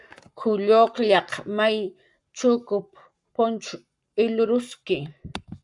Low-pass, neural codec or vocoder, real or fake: 10.8 kHz; codec, 44.1 kHz, 7.8 kbps, Pupu-Codec; fake